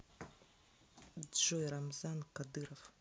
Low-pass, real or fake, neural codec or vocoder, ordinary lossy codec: none; real; none; none